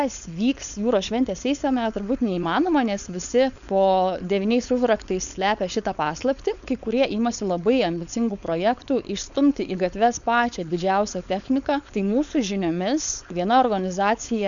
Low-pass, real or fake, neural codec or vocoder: 7.2 kHz; fake; codec, 16 kHz, 4.8 kbps, FACodec